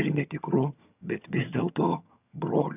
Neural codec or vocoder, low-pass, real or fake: vocoder, 22.05 kHz, 80 mel bands, HiFi-GAN; 3.6 kHz; fake